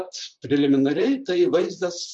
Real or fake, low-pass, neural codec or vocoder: fake; 10.8 kHz; vocoder, 44.1 kHz, 128 mel bands, Pupu-Vocoder